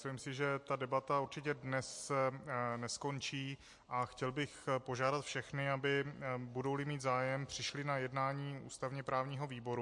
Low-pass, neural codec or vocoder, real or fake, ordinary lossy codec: 10.8 kHz; none; real; MP3, 48 kbps